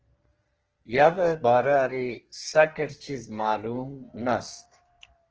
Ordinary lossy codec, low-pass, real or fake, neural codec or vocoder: Opus, 16 kbps; 7.2 kHz; fake; codec, 32 kHz, 1.9 kbps, SNAC